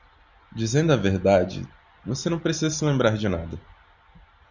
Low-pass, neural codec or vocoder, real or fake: 7.2 kHz; none; real